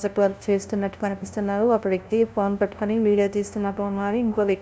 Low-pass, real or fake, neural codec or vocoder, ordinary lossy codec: none; fake; codec, 16 kHz, 0.5 kbps, FunCodec, trained on LibriTTS, 25 frames a second; none